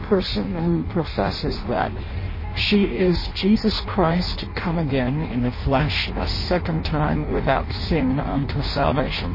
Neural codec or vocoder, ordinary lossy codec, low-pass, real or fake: codec, 16 kHz in and 24 kHz out, 0.6 kbps, FireRedTTS-2 codec; MP3, 24 kbps; 5.4 kHz; fake